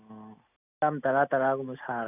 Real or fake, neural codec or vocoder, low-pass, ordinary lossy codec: real; none; 3.6 kHz; none